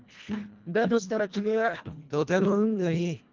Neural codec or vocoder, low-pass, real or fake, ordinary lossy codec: codec, 24 kHz, 1.5 kbps, HILCodec; 7.2 kHz; fake; Opus, 24 kbps